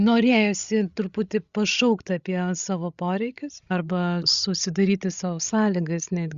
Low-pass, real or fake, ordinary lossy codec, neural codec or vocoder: 7.2 kHz; fake; Opus, 64 kbps; codec, 16 kHz, 16 kbps, FreqCodec, larger model